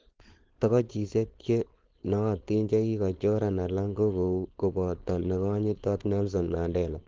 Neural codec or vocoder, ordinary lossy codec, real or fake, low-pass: codec, 16 kHz, 4.8 kbps, FACodec; Opus, 16 kbps; fake; 7.2 kHz